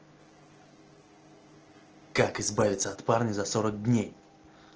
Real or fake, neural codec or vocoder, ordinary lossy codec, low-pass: real; none; Opus, 16 kbps; 7.2 kHz